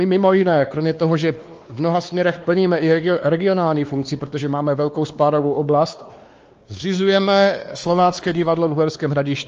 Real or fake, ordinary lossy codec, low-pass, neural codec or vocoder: fake; Opus, 24 kbps; 7.2 kHz; codec, 16 kHz, 2 kbps, X-Codec, WavLM features, trained on Multilingual LibriSpeech